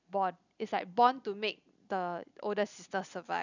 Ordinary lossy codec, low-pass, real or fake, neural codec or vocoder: none; 7.2 kHz; real; none